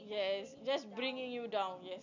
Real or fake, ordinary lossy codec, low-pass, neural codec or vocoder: real; none; 7.2 kHz; none